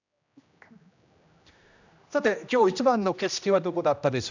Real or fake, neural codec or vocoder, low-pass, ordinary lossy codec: fake; codec, 16 kHz, 1 kbps, X-Codec, HuBERT features, trained on general audio; 7.2 kHz; none